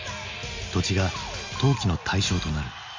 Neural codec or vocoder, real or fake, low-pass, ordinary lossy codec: none; real; 7.2 kHz; MP3, 48 kbps